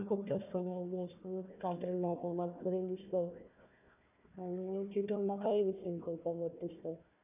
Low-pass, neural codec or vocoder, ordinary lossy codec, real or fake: 3.6 kHz; codec, 16 kHz, 1 kbps, FunCodec, trained on Chinese and English, 50 frames a second; none; fake